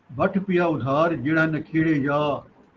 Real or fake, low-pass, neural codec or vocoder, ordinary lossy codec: real; 7.2 kHz; none; Opus, 16 kbps